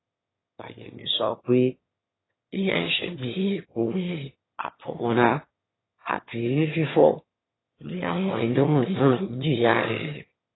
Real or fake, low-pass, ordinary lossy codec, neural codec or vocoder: fake; 7.2 kHz; AAC, 16 kbps; autoencoder, 22.05 kHz, a latent of 192 numbers a frame, VITS, trained on one speaker